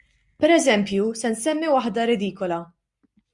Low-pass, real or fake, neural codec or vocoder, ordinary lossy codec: 10.8 kHz; real; none; Opus, 64 kbps